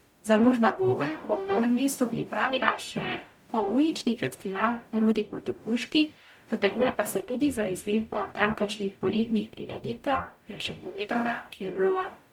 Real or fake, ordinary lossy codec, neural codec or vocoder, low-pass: fake; MP3, 96 kbps; codec, 44.1 kHz, 0.9 kbps, DAC; 19.8 kHz